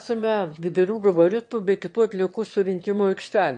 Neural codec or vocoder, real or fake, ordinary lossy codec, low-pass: autoencoder, 22.05 kHz, a latent of 192 numbers a frame, VITS, trained on one speaker; fake; MP3, 48 kbps; 9.9 kHz